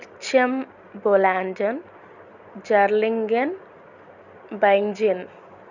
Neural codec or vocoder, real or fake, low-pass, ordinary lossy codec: none; real; 7.2 kHz; none